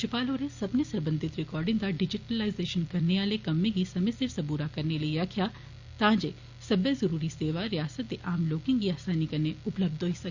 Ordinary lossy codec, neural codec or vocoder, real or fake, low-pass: Opus, 64 kbps; none; real; 7.2 kHz